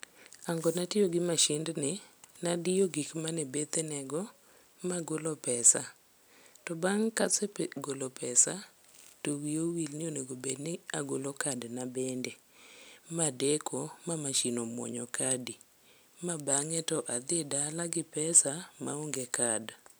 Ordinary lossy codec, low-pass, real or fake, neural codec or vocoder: none; none; real; none